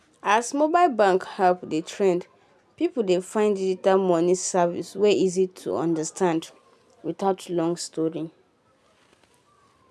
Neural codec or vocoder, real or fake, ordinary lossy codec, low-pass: none; real; none; none